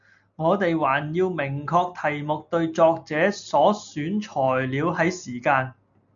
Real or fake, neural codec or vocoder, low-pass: real; none; 7.2 kHz